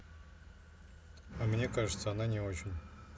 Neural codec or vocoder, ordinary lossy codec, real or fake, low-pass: none; none; real; none